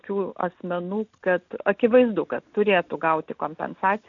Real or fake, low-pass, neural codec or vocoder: real; 7.2 kHz; none